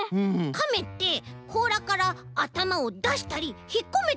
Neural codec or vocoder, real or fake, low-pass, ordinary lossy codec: none; real; none; none